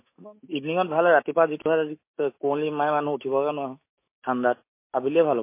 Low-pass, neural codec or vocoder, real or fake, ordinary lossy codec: 3.6 kHz; autoencoder, 48 kHz, 128 numbers a frame, DAC-VAE, trained on Japanese speech; fake; MP3, 24 kbps